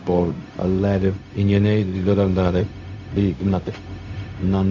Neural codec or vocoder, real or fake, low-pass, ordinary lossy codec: codec, 16 kHz, 0.4 kbps, LongCat-Audio-Codec; fake; 7.2 kHz; none